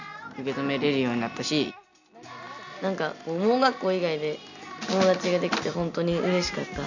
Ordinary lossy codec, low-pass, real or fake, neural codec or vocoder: none; 7.2 kHz; real; none